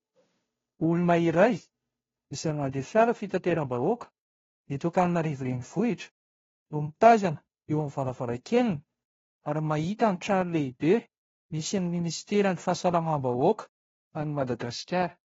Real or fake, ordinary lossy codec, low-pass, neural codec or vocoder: fake; AAC, 24 kbps; 7.2 kHz; codec, 16 kHz, 0.5 kbps, FunCodec, trained on Chinese and English, 25 frames a second